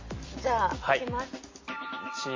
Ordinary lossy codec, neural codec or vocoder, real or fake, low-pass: MP3, 32 kbps; none; real; 7.2 kHz